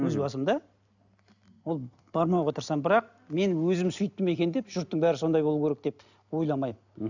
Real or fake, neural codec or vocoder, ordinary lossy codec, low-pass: real; none; none; 7.2 kHz